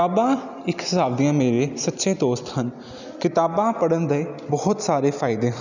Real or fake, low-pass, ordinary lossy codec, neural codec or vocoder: real; 7.2 kHz; none; none